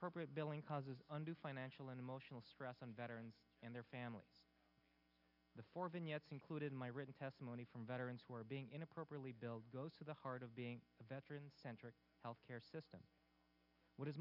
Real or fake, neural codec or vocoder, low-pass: real; none; 5.4 kHz